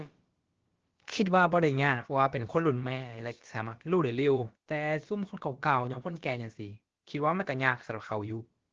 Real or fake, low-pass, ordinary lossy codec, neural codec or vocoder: fake; 7.2 kHz; Opus, 16 kbps; codec, 16 kHz, about 1 kbps, DyCAST, with the encoder's durations